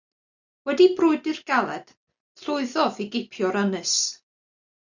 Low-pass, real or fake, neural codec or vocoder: 7.2 kHz; real; none